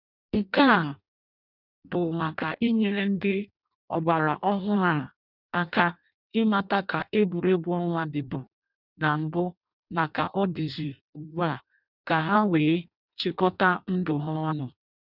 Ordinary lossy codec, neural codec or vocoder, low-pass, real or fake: none; codec, 16 kHz in and 24 kHz out, 0.6 kbps, FireRedTTS-2 codec; 5.4 kHz; fake